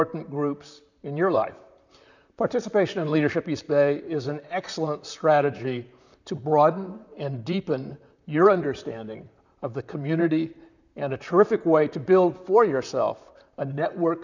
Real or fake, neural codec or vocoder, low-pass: fake; vocoder, 44.1 kHz, 128 mel bands, Pupu-Vocoder; 7.2 kHz